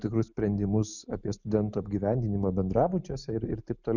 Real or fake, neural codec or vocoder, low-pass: real; none; 7.2 kHz